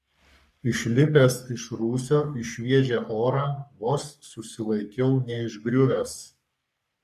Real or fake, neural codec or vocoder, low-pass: fake; codec, 44.1 kHz, 3.4 kbps, Pupu-Codec; 14.4 kHz